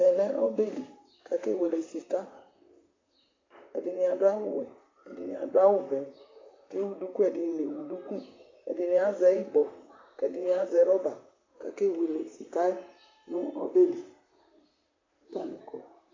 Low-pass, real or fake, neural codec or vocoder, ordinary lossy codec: 7.2 kHz; fake; vocoder, 44.1 kHz, 128 mel bands, Pupu-Vocoder; AAC, 32 kbps